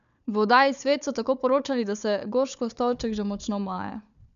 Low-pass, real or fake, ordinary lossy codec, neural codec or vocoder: 7.2 kHz; fake; none; codec, 16 kHz, 4 kbps, FunCodec, trained on Chinese and English, 50 frames a second